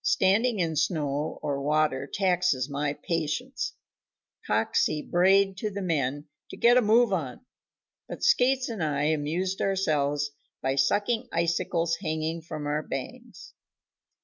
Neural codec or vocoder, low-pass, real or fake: none; 7.2 kHz; real